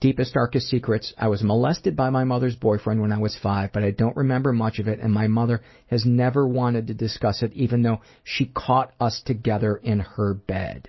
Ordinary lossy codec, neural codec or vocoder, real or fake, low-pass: MP3, 24 kbps; none; real; 7.2 kHz